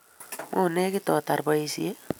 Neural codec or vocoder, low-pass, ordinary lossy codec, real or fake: none; none; none; real